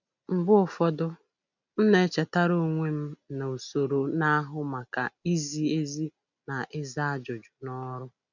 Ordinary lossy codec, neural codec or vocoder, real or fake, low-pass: none; none; real; 7.2 kHz